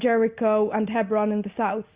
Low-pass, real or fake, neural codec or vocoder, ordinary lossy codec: 3.6 kHz; real; none; Opus, 64 kbps